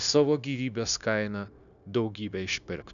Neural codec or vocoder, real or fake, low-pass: codec, 16 kHz, 0.9 kbps, LongCat-Audio-Codec; fake; 7.2 kHz